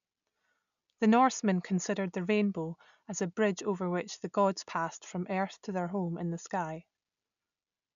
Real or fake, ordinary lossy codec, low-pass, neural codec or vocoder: real; none; 7.2 kHz; none